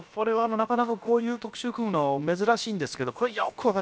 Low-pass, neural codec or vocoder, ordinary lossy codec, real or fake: none; codec, 16 kHz, about 1 kbps, DyCAST, with the encoder's durations; none; fake